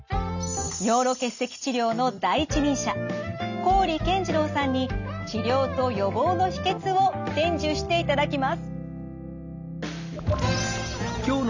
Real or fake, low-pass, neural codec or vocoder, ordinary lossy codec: real; 7.2 kHz; none; none